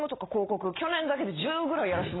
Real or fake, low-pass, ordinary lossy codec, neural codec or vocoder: real; 7.2 kHz; AAC, 16 kbps; none